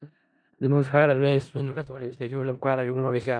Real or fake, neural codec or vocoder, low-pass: fake; codec, 16 kHz in and 24 kHz out, 0.4 kbps, LongCat-Audio-Codec, four codebook decoder; 9.9 kHz